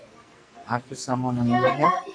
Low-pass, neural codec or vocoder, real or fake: 9.9 kHz; codec, 44.1 kHz, 2.6 kbps, SNAC; fake